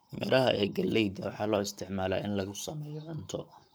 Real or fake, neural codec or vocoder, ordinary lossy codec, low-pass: fake; codec, 44.1 kHz, 7.8 kbps, Pupu-Codec; none; none